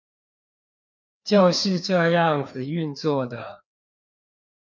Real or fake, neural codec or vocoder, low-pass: fake; codec, 16 kHz, 2 kbps, FreqCodec, larger model; 7.2 kHz